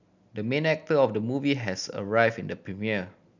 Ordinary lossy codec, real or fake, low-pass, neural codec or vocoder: none; real; 7.2 kHz; none